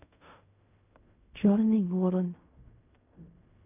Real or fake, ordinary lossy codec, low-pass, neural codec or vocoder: fake; none; 3.6 kHz; codec, 16 kHz in and 24 kHz out, 0.4 kbps, LongCat-Audio-Codec, fine tuned four codebook decoder